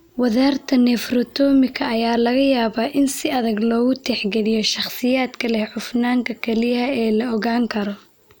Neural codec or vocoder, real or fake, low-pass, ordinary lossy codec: none; real; none; none